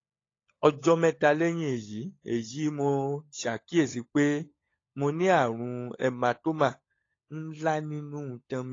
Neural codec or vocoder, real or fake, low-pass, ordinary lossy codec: codec, 16 kHz, 16 kbps, FunCodec, trained on LibriTTS, 50 frames a second; fake; 7.2 kHz; AAC, 32 kbps